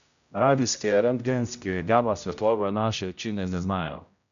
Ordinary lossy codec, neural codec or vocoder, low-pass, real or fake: AAC, 96 kbps; codec, 16 kHz, 0.5 kbps, X-Codec, HuBERT features, trained on general audio; 7.2 kHz; fake